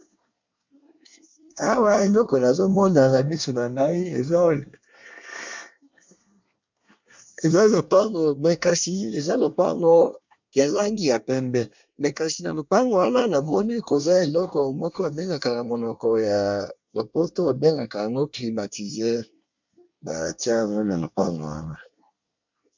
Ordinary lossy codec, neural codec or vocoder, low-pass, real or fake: MP3, 64 kbps; codec, 24 kHz, 1 kbps, SNAC; 7.2 kHz; fake